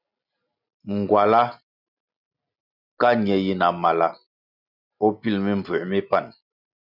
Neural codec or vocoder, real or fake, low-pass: none; real; 5.4 kHz